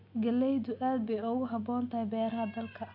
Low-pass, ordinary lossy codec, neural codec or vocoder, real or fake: 5.4 kHz; AAC, 48 kbps; none; real